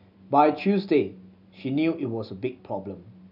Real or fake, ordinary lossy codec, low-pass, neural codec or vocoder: real; none; 5.4 kHz; none